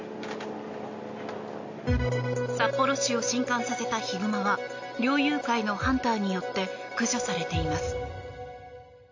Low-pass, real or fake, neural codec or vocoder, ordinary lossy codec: 7.2 kHz; real; none; AAC, 48 kbps